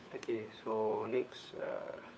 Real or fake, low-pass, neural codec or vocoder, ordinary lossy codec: fake; none; codec, 16 kHz, 4 kbps, FunCodec, trained on LibriTTS, 50 frames a second; none